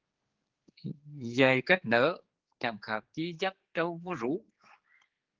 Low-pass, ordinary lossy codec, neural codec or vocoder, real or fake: 7.2 kHz; Opus, 16 kbps; codec, 16 kHz, 4 kbps, X-Codec, HuBERT features, trained on general audio; fake